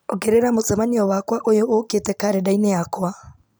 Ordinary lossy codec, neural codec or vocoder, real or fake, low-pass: none; none; real; none